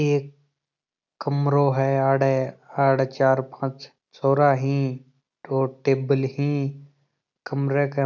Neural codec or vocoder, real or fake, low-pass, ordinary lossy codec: none; real; 7.2 kHz; none